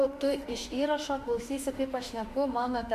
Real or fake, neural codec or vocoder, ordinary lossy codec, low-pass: fake; autoencoder, 48 kHz, 32 numbers a frame, DAC-VAE, trained on Japanese speech; MP3, 96 kbps; 14.4 kHz